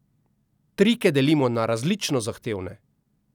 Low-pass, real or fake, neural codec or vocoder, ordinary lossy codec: 19.8 kHz; real; none; none